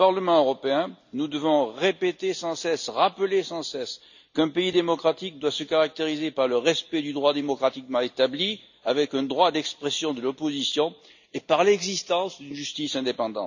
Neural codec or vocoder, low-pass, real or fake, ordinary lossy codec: none; 7.2 kHz; real; none